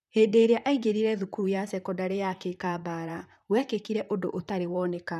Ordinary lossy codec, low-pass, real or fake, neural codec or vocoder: none; 14.4 kHz; fake; vocoder, 44.1 kHz, 128 mel bands, Pupu-Vocoder